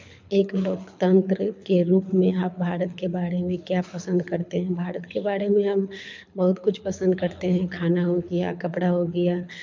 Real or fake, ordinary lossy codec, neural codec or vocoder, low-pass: fake; MP3, 64 kbps; codec, 24 kHz, 6 kbps, HILCodec; 7.2 kHz